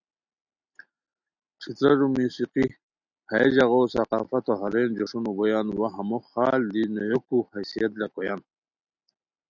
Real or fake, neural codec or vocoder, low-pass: real; none; 7.2 kHz